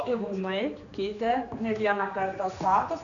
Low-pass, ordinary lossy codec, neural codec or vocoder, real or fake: 7.2 kHz; MP3, 96 kbps; codec, 16 kHz, 2 kbps, X-Codec, HuBERT features, trained on general audio; fake